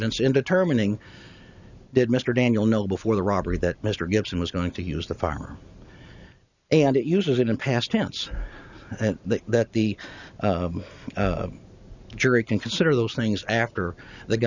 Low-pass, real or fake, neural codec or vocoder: 7.2 kHz; real; none